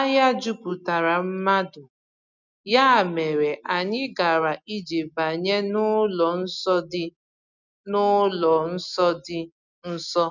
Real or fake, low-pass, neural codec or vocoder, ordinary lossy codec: real; 7.2 kHz; none; none